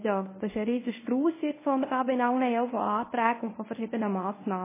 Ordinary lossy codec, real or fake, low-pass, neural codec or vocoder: MP3, 16 kbps; fake; 3.6 kHz; codec, 24 kHz, 0.9 kbps, WavTokenizer, medium speech release version 1